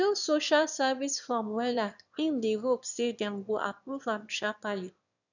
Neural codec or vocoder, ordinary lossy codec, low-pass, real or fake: autoencoder, 22.05 kHz, a latent of 192 numbers a frame, VITS, trained on one speaker; none; 7.2 kHz; fake